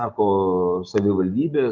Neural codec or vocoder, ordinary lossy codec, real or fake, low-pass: none; Opus, 24 kbps; real; 7.2 kHz